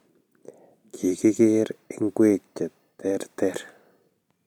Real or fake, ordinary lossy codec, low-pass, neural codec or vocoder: fake; none; 19.8 kHz; vocoder, 44.1 kHz, 128 mel bands every 256 samples, BigVGAN v2